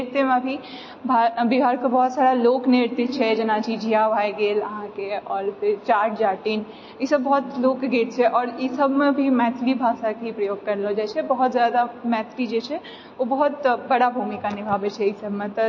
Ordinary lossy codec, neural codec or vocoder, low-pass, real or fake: MP3, 32 kbps; none; 7.2 kHz; real